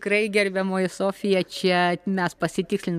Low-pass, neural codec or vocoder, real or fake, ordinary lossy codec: 14.4 kHz; none; real; MP3, 96 kbps